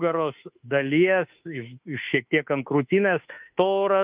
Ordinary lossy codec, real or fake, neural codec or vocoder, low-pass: Opus, 32 kbps; fake; autoencoder, 48 kHz, 32 numbers a frame, DAC-VAE, trained on Japanese speech; 3.6 kHz